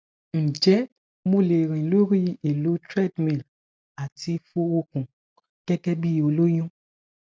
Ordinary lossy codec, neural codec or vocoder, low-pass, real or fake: none; none; none; real